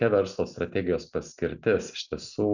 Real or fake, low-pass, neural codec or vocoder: real; 7.2 kHz; none